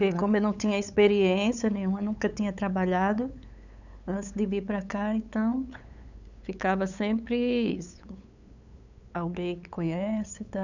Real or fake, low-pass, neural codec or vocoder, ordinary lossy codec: fake; 7.2 kHz; codec, 16 kHz, 8 kbps, FunCodec, trained on LibriTTS, 25 frames a second; none